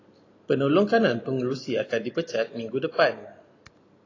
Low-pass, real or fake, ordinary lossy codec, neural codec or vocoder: 7.2 kHz; real; AAC, 32 kbps; none